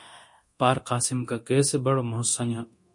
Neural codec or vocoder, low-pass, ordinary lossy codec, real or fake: codec, 24 kHz, 0.9 kbps, DualCodec; 10.8 kHz; MP3, 48 kbps; fake